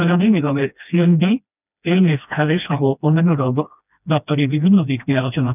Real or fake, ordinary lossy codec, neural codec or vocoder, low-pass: fake; none; codec, 16 kHz, 1 kbps, FreqCodec, smaller model; 3.6 kHz